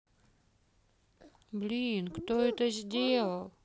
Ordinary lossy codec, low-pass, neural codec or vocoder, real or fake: none; none; none; real